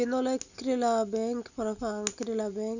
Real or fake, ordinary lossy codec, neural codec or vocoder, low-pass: real; none; none; 7.2 kHz